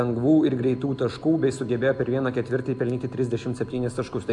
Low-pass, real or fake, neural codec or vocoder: 10.8 kHz; real; none